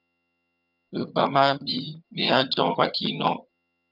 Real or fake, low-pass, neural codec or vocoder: fake; 5.4 kHz; vocoder, 22.05 kHz, 80 mel bands, HiFi-GAN